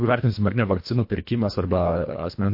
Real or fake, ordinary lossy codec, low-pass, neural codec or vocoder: fake; MP3, 32 kbps; 5.4 kHz; codec, 24 kHz, 1.5 kbps, HILCodec